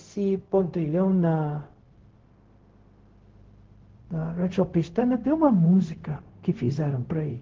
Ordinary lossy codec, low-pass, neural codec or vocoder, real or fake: Opus, 16 kbps; 7.2 kHz; codec, 16 kHz, 0.4 kbps, LongCat-Audio-Codec; fake